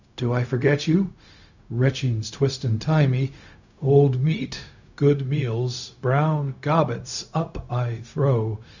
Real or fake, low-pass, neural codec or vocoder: fake; 7.2 kHz; codec, 16 kHz, 0.4 kbps, LongCat-Audio-Codec